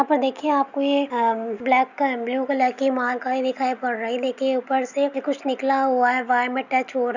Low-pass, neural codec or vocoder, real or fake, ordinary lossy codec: 7.2 kHz; none; real; none